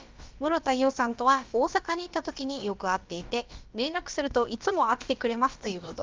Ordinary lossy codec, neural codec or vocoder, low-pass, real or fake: Opus, 24 kbps; codec, 16 kHz, about 1 kbps, DyCAST, with the encoder's durations; 7.2 kHz; fake